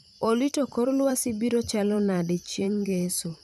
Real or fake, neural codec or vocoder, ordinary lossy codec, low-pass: fake; vocoder, 44.1 kHz, 128 mel bands every 512 samples, BigVGAN v2; none; 14.4 kHz